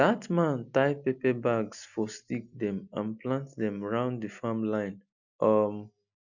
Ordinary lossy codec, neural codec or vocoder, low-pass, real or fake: none; none; 7.2 kHz; real